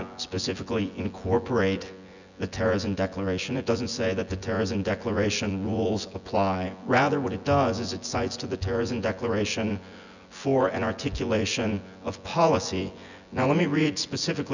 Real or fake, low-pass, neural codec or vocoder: fake; 7.2 kHz; vocoder, 24 kHz, 100 mel bands, Vocos